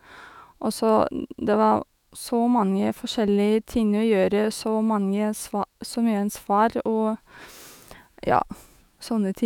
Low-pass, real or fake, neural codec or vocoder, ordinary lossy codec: 19.8 kHz; fake; autoencoder, 48 kHz, 128 numbers a frame, DAC-VAE, trained on Japanese speech; none